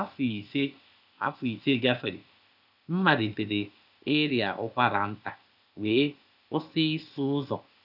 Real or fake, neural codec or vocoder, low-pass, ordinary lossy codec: fake; codec, 16 kHz, 0.8 kbps, ZipCodec; 5.4 kHz; none